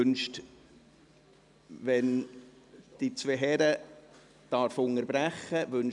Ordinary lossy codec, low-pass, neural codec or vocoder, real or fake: none; 10.8 kHz; none; real